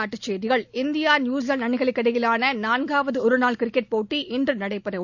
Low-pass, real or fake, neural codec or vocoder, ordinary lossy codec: 7.2 kHz; real; none; none